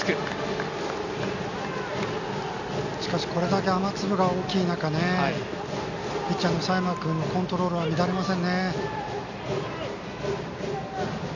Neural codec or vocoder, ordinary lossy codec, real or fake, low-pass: none; none; real; 7.2 kHz